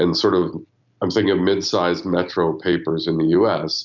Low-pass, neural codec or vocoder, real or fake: 7.2 kHz; none; real